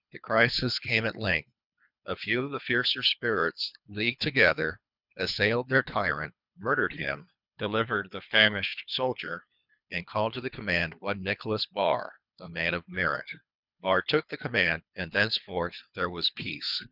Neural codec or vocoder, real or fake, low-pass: codec, 24 kHz, 3 kbps, HILCodec; fake; 5.4 kHz